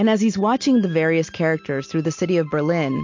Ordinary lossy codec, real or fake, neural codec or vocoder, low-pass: MP3, 48 kbps; real; none; 7.2 kHz